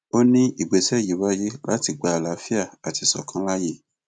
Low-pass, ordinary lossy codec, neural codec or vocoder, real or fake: none; none; none; real